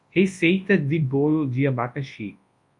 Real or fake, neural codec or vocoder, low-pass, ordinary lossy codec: fake; codec, 24 kHz, 0.9 kbps, WavTokenizer, large speech release; 10.8 kHz; MP3, 48 kbps